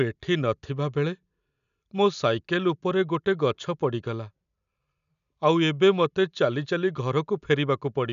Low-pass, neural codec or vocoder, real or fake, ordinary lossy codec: 7.2 kHz; none; real; none